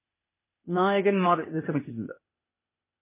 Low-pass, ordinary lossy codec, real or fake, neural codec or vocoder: 3.6 kHz; MP3, 16 kbps; fake; codec, 16 kHz, 0.8 kbps, ZipCodec